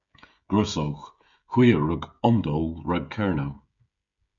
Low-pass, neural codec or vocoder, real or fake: 7.2 kHz; codec, 16 kHz, 16 kbps, FreqCodec, smaller model; fake